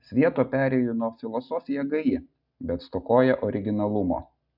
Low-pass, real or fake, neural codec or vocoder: 5.4 kHz; real; none